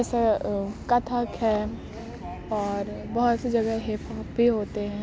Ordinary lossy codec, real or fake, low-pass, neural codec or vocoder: none; real; none; none